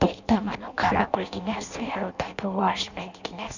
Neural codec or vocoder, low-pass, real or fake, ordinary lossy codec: codec, 16 kHz in and 24 kHz out, 0.6 kbps, FireRedTTS-2 codec; 7.2 kHz; fake; none